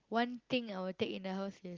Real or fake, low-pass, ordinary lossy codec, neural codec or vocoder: real; 7.2 kHz; Opus, 32 kbps; none